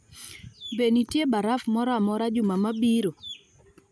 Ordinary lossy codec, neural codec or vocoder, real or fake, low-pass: none; none; real; none